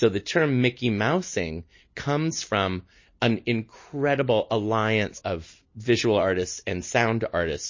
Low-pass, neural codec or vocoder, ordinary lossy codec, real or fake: 7.2 kHz; none; MP3, 32 kbps; real